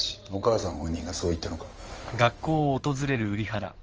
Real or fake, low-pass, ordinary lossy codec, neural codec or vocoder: fake; 7.2 kHz; Opus, 24 kbps; codec, 16 kHz in and 24 kHz out, 2.2 kbps, FireRedTTS-2 codec